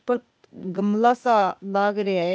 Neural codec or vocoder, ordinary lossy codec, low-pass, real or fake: codec, 16 kHz, 0.8 kbps, ZipCodec; none; none; fake